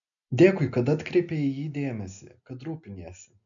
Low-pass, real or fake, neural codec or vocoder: 7.2 kHz; real; none